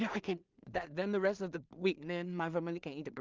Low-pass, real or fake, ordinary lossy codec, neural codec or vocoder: 7.2 kHz; fake; Opus, 24 kbps; codec, 16 kHz in and 24 kHz out, 0.4 kbps, LongCat-Audio-Codec, two codebook decoder